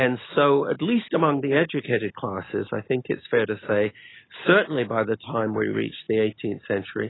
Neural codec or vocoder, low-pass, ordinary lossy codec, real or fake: none; 7.2 kHz; AAC, 16 kbps; real